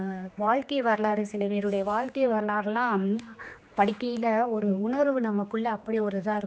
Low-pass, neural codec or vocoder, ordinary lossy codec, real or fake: none; codec, 16 kHz, 2 kbps, X-Codec, HuBERT features, trained on general audio; none; fake